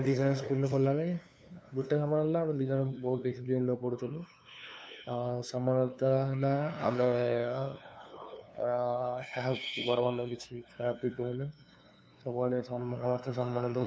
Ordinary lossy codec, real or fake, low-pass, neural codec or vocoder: none; fake; none; codec, 16 kHz, 2 kbps, FunCodec, trained on LibriTTS, 25 frames a second